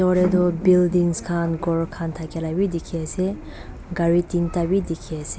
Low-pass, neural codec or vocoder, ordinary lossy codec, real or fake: none; none; none; real